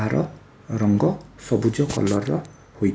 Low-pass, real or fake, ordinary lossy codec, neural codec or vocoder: none; real; none; none